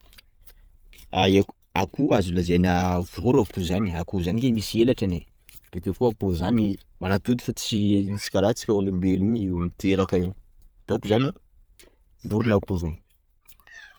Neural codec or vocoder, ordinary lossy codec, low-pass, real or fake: vocoder, 44.1 kHz, 128 mel bands every 512 samples, BigVGAN v2; none; none; fake